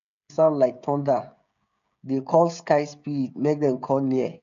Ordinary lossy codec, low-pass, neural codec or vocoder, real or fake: none; 7.2 kHz; codec, 16 kHz, 16 kbps, FreqCodec, smaller model; fake